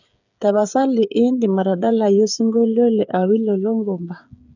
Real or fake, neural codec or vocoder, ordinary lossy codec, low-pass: fake; codec, 16 kHz, 8 kbps, FreqCodec, smaller model; none; 7.2 kHz